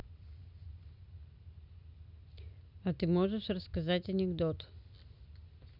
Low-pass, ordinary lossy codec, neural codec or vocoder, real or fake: 5.4 kHz; none; none; real